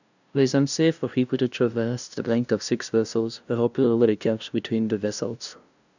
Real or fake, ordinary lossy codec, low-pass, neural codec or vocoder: fake; none; 7.2 kHz; codec, 16 kHz, 0.5 kbps, FunCodec, trained on LibriTTS, 25 frames a second